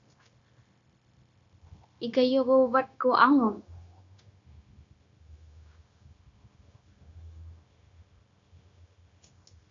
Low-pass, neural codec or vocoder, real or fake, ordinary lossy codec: 7.2 kHz; codec, 16 kHz, 0.9 kbps, LongCat-Audio-Codec; fake; AAC, 64 kbps